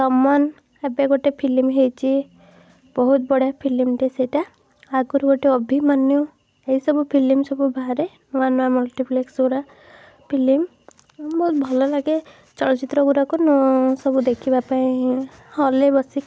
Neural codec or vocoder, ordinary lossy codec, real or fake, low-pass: none; none; real; none